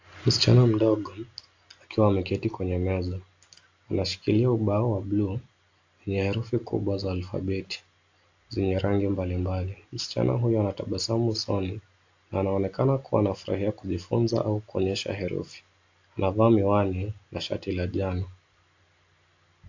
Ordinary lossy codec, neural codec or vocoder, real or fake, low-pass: AAC, 48 kbps; none; real; 7.2 kHz